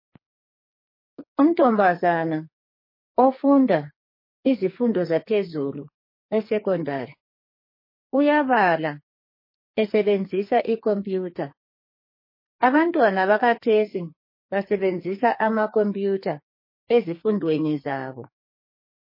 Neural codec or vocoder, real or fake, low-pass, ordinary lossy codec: codec, 44.1 kHz, 2.6 kbps, SNAC; fake; 5.4 kHz; MP3, 24 kbps